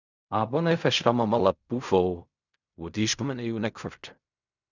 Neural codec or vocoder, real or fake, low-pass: codec, 16 kHz in and 24 kHz out, 0.4 kbps, LongCat-Audio-Codec, fine tuned four codebook decoder; fake; 7.2 kHz